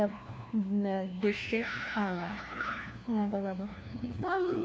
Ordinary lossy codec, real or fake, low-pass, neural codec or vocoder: none; fake; none; codec, 16 kHz, 1 kbps, FunCodec, trained on LibriTTS, 50 frames a second